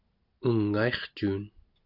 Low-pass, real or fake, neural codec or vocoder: 5.4 kHz; real; none